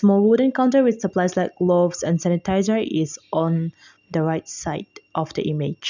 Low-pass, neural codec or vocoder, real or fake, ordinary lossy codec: 7.2 kHz; none; real; none